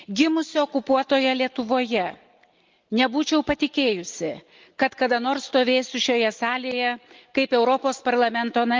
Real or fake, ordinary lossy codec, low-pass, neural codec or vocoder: real; Opus, 32 kbps; 7.2 kHz; none